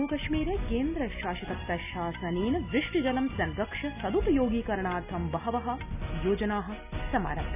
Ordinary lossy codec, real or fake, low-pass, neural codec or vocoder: none; real; 3.6 kHz; none